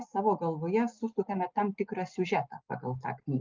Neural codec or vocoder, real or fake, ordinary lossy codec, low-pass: none; real; Opus, 32 kbps; 7.2 kHz